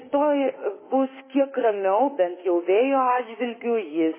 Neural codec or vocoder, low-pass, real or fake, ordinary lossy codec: autoencoder, 48 kHz, 32 numbers a frame, DAC-VAE, trained on Japanese speech; 3.6 kHz; fake; MP3, 16 kbps